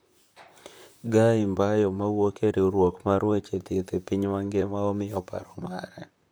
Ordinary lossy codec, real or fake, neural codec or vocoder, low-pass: none; fake; vocoder, 44.1 kHz, 128 mel bands, Pupu-Vocoder; none